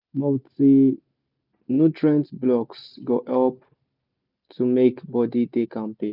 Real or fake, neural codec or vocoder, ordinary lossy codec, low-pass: real; none; none; 5.4 kHz